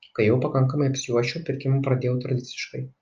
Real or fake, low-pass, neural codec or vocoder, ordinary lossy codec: real; 7.2 kHz; none; Opus, 24 kbps